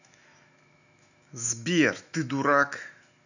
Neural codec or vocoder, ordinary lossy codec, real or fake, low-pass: autoencoder, 48 kHz, 128 numbers a frame, DAC-VAE, trained on Japanese speech; none; fake; 7.2 kHz